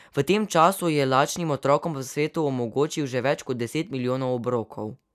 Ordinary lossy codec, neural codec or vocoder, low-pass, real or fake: none; none; 14.4 kHz; real